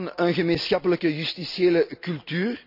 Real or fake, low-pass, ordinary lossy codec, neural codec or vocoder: real; 5.4 kHz; none; none